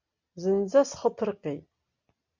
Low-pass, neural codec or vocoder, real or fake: 7.2 kHz; none; real